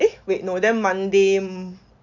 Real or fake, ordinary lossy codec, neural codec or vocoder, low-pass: real; none; none; 7.2 kHz